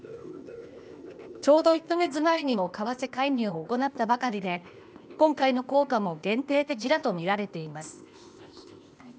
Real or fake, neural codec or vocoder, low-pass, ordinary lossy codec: fake; codec, 16 kHz, 0.8 kbps, ZipCodec; none; none